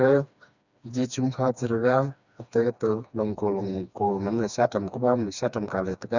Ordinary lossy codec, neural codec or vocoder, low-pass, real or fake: none; codec, 16 kHz, 2 kbps, FreqCodec, smaller model; 7.2 kHz; fake